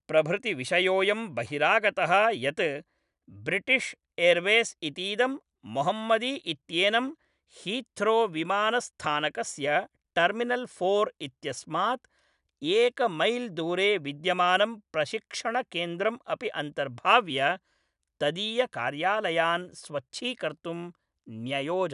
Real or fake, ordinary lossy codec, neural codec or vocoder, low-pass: real; none; none; 10.8 kHz